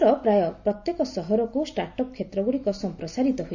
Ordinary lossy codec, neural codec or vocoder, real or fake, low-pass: none; none; real; 7.2 kHz